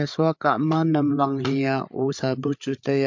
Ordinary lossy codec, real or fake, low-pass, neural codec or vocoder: MP3, 48 kbps; fake; 7.2 kHz; codec, 16 kHz, 8 kbps, FreqCodec, larger model